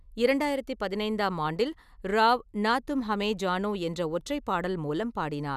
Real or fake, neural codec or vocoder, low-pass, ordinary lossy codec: real; none; 14.4 kHz; none